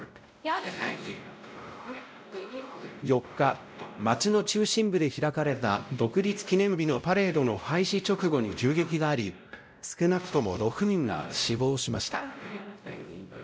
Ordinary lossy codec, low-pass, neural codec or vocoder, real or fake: none; none; codec, 16 kHz, 0.5 kbps, X-Codec, WavLM features, trained on Multilingual LibriSpeech; fake